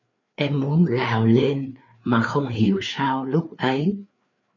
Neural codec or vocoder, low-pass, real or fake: codec, 16 kHz, 4 kbps, FreqCodec, larger model; 7.2 kHz; fake